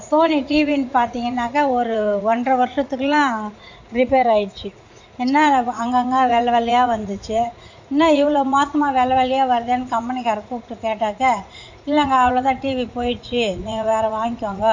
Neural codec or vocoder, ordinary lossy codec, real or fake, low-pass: vocoder, 44.1 kHz, 80 mel bands, Vocos; MP3, 48 kbps; fake; 7.2 kHz